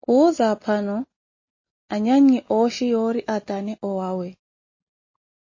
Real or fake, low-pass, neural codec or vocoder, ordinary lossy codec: real; 7.2 kHz; none; MP3, 32 kbps